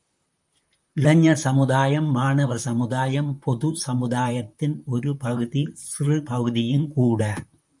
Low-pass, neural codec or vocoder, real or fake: 10.8 kHz; vocoder, 44.1 kHz, 128 mel bands, Pupu-Vocoder; fake